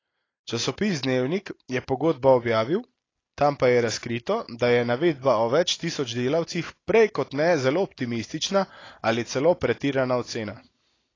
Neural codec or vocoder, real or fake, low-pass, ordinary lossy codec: none; real; 7.2 kHz; AAC, 32 kbps